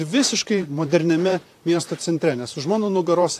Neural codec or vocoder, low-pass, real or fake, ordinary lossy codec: vocoder, 44.1 kHz, 128 mel bands, Pupu-Vocoder; 14.4 kHz; fake; AAC, 64 kbps